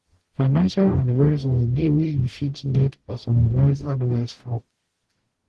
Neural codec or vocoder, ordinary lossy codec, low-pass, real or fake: codec, 44.1 kHz, 0.9 kbps, DAC; Opus, 16 kbps; 10.8 kHz; fake